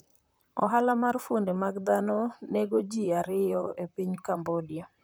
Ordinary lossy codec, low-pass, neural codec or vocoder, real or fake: none; none; vocoder, 44.1 kHz, 128 mel bands, Pupu-Vocoder; fake